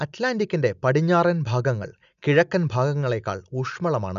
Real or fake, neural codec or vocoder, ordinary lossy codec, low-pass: real; none; none; 7.2 kHz